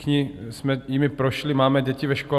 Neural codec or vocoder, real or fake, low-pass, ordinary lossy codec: none; real; 14.4 kHz; Opus, 64 kbps